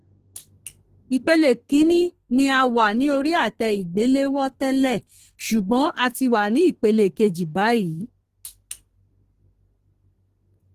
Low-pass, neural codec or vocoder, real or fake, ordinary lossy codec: 14.4 kHz; codec, 32 kHz, 1.9 kbps, SNAC; fake; Opus, 16 kbps